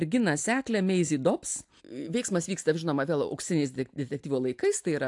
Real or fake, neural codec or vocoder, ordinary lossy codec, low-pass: real; none; AAC, 64 kbps; 10.8 kHz